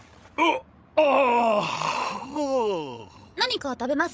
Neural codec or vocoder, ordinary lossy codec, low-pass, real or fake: codec, 16 kHz, 16 kbps, FreqCodec, larger model; none; none; fake